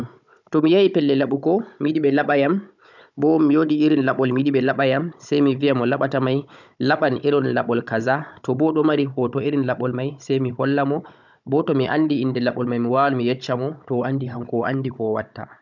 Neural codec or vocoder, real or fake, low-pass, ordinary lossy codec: codec, 16 kHz, 16 kbps, FunCodec, trained on Chinese and English, 50 frames a second; fake; 7.2 kHz; none